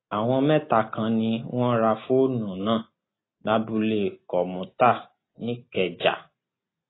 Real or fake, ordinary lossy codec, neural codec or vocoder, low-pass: real; AAC, 16 kbps; none; 7.2 kHz